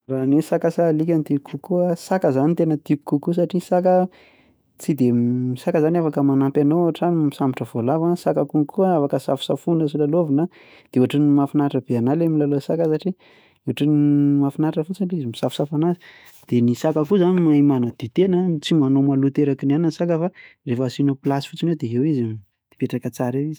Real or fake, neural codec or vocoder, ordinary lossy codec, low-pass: fake; autoencoder, 48 kHz, 128 numbers a frame, DAC-VAE, trained on Japanese speech; none; none